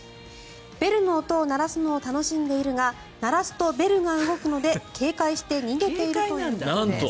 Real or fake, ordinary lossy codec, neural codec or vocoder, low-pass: real; none; none; none